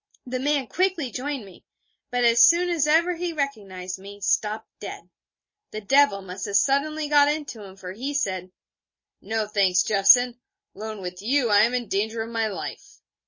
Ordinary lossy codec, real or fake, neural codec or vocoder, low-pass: MP3, 32 kbps; real; none; 7.2 kHz